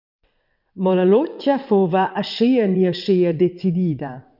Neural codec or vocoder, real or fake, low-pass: vocoder, 22.05 kHz, 80 mel bands, WaveNeXt; fake; 5.4 kHz